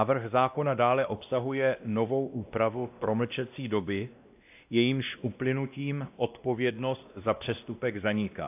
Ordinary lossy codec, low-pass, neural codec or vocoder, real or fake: AAC, 32 kbps; 3.6 kHz; codec, 16 kHz, 1 kbps, X-Codec, WavLM features, trained on Multilingual LibriSpeech; fake